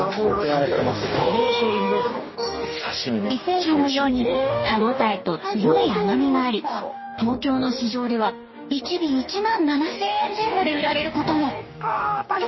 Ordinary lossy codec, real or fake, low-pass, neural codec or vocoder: MP3, 24 kbps; fake; 7.2 kHz; codec, 44.1 kHz, 2.6 kbps, DAC